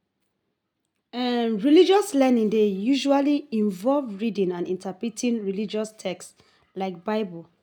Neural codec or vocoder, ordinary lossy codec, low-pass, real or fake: none; none; 19.8 kHz; real